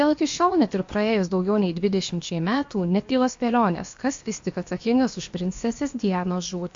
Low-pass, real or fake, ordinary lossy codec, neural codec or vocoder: 7.2 kHz; fake; MP3, 48 kbps; codec, 16 kHz, about 1 kbps, DyCAST, with the encoder's durations